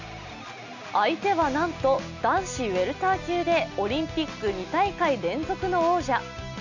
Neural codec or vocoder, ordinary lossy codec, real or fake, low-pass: none; none; real; 7.2 kHz